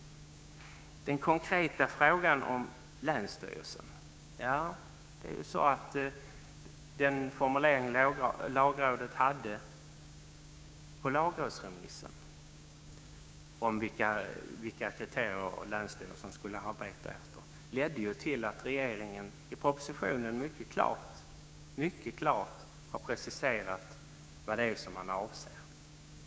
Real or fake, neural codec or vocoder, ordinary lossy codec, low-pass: fake; codec, 16 kHz, 6 kbps, DAC; none; none